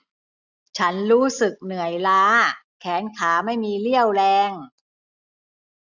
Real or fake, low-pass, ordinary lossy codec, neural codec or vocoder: real; 7.2 kHz; none; none